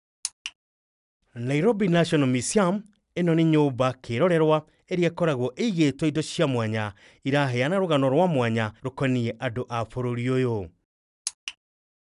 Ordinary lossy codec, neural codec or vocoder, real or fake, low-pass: none; none; real; 10.8 kHz